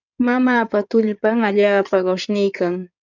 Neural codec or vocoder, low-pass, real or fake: codec, 16 kHz in and 24 kHz out, 2.2 kbps, FireRedTTS-2 codec; 7.2 kHz; fake